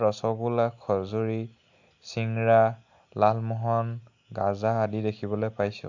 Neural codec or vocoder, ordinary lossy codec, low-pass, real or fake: none; AAC, 48 kbps; 7.2 kHz; real